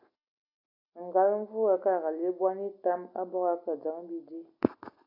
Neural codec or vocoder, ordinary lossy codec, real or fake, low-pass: none; AAC, 32 kbps; real; 5.4 kHz